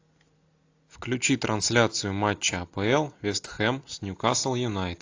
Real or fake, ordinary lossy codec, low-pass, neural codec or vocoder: real; AAC, 48 kbps; 7.2 kHz; none